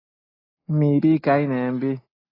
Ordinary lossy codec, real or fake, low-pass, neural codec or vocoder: AAC, 24 kbps; real; 5.4 kHz; none